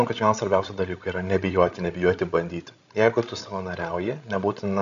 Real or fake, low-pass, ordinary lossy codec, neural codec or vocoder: fake; 7.2 kHz; AAC, 64 kbps; codec, 16 kHz, 16 kbps, FreqCodec, larger model